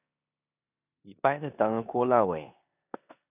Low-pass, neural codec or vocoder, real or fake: 3.6 kHz; codec, 16 kHz in and 24 kHz out, 0.9 kbps, LongCat-Audio-Codec, four codebook decoder; fake